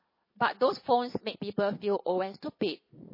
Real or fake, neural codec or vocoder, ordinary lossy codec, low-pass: fake; vocoder, 22.05 kHz, 80 mel bands, Vocos; MP3, 24 kbps; 5.4 kHz